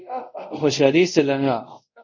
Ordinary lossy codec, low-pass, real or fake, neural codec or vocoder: MP3, 64 kbps; 7.2 kHz; fake; codec, 24 kHz, 0.5 kbps, DualCodec